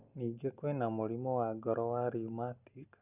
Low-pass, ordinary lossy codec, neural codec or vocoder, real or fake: 3.6 kHz; none; none; real